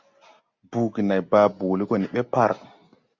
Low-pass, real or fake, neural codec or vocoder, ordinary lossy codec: 7.2 kHz; real; none; Opus, 64 kbps